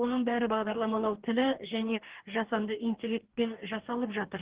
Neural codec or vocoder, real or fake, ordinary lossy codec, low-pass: codec, 44.1 kHz, 2.6 kbps, DAC; fake; Opus, 16 kbps; 3.6 kHz